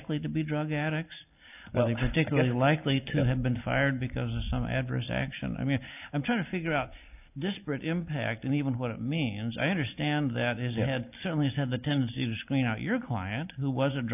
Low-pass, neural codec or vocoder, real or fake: 3.6 kHz; none; real